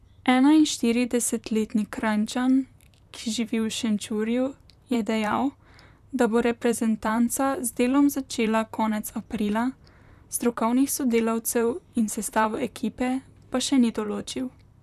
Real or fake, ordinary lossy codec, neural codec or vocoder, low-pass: fake; none; vocoder, 44.1 kHz, 128 mel bands, Pupu-Vocoder; 14.4 kHz